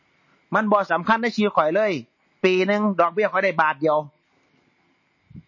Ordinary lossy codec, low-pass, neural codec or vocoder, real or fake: MP3, 32 kbps; 7.2 kHz; none; real